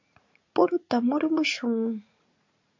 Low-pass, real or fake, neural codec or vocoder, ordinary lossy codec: 7.2 kHz; fake; vocoder, 44.1 kHz, 80 mel bands, Vocos; MP3, 64 kbps